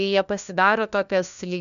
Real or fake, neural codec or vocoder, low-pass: fake; codec, 16 kHz, 1 kbps, FunCodec, trained on LibriTTS, 50 frames a second; 7.2 kHz